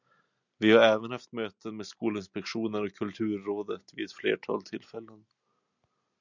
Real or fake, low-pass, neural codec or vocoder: real; 7.2 kHz; none